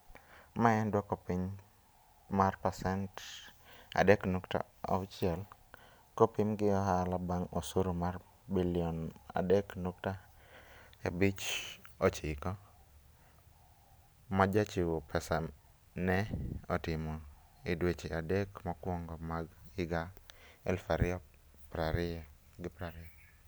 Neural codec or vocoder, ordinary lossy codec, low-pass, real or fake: none; none; none; real